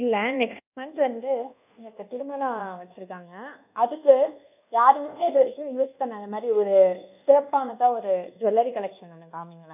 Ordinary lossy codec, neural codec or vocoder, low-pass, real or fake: none; codec, 24 kHz, 1.2 kbps, DualCodec; 3.6 kHz; fake